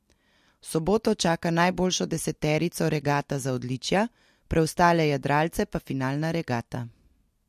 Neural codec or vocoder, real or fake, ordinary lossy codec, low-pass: none; real; MP3, 64 kbps; 14.4 kHz